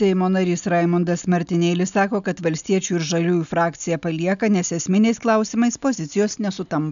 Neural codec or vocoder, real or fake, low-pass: none; real; 7.2 kHz